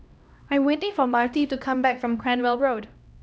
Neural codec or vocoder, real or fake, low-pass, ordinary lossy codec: codec, 16 kHz, 1 kbps, X-Codec, HuBERT features, trained on LibriSpeech; fake; none; none